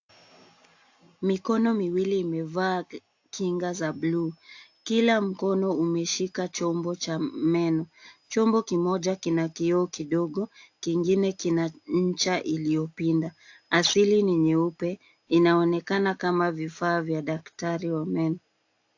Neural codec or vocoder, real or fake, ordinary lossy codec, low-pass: none; real; AAC, 48 kbps; 7.2 kHz